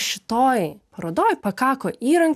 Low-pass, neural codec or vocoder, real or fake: 14.4 kHz; none; real